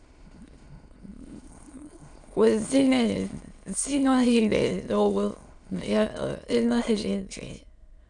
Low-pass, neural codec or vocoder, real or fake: 9.9 kHz; autoencoder, 22.05 kHz, a latent of 192 numbers a frame, VITS, trained on many speakers; fake